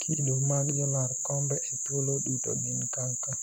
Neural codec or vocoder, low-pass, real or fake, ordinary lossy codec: none; 19.8 kHz; real; none